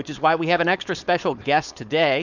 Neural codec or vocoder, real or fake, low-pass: codec, 16 kHz, 4.8 kbps, FACodec; fake; 7.2 kHz